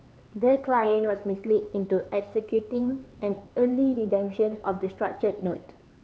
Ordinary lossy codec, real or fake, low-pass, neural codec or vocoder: none; fake; none; codec, 16 kHz, 4 kbps, X-Codec, HuBERT features, trained on LibriSpeech